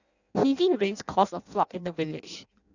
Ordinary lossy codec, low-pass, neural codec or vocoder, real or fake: none; 7.2 kHz; codec, 16 kHz in and 24 kHz out, 0.6 kbps, FireRedTTS-2 codec; fake